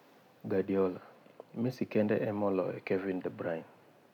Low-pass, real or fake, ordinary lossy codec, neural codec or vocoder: 19.8 kHz; real; none; none